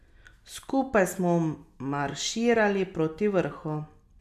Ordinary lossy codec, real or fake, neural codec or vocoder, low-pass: none; real; none; 14.4 kHz